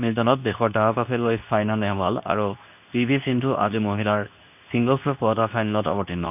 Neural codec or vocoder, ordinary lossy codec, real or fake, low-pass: codec, 24 kHz, 0.9 kbps, WavTokenizer, medium speech release version 2; none; fake; 3.6 kHz